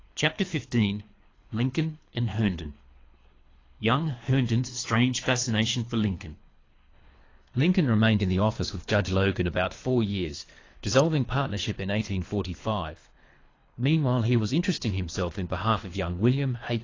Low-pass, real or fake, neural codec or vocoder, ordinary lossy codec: 7.2 kHz; fake; codec, 24 kHz, 3 kbps, HILCodec; AAC, 32 kbps